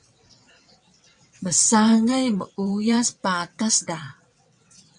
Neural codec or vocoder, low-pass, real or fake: vocoder, 22.05 kHz, 80 mel bands, WaveNeXt; 9.9 kHz; fake